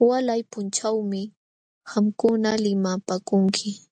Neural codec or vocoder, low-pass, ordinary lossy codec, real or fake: none; 9.9 kHz; AAC, 64 kbps; real